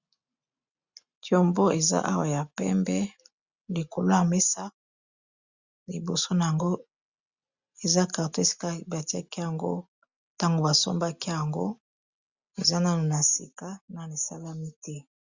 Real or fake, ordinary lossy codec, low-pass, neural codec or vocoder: real; Opus, 64 kbps; 7.2 kHz; none